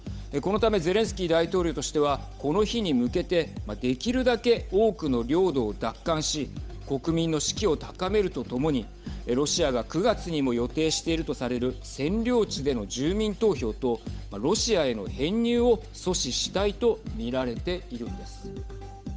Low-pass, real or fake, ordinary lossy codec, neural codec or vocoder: none; fake; none; codec, 16 kHz, 8 kbps, FunCodec, trained on Chinese and English, 25 frames a second